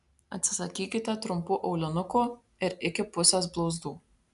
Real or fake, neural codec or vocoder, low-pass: real; none; 10.8 kHz